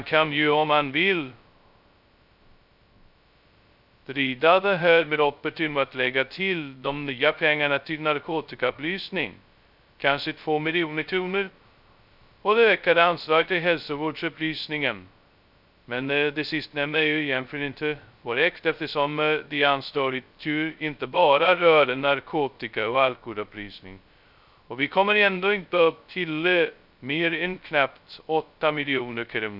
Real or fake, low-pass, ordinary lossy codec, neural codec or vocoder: fake; 5.4 kHz; none; codec, 16 kHz, 0.2 kbps, FocalCodec